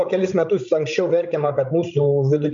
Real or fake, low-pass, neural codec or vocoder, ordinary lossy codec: fake; 7.2 kHz; codec, 16 kHz, 16 kbps, FreqCodec, larger model; MP3, 64 kbps